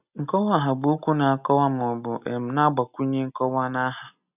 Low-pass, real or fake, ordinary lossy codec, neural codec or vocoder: 3.6 kHz; real; none; none